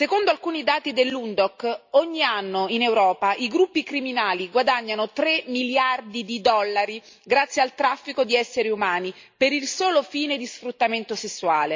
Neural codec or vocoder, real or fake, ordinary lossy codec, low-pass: none; real; MP3, 48 kbps; 7.2 kHz